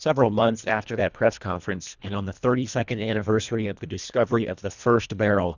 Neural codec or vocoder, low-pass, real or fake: codec, 24 kHz, 1.5 kbps, HILCodec; 7.2 kHz; fake